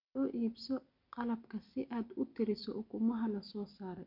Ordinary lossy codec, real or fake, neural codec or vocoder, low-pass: none; real; none; 5.4 kHz